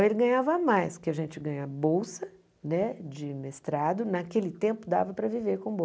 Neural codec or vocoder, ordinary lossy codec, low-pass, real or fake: none; none; none; real